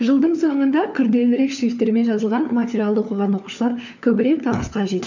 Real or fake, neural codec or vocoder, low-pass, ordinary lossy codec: fake; codec, 16 kHz, 4 kbps, FunCodec, trained on LibriTTS, 50 frames a second; 7.2 kHz; none